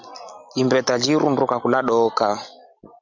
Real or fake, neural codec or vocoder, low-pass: real; none; 7.2 kHz